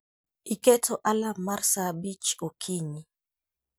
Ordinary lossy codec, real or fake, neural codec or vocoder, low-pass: none; real; none; none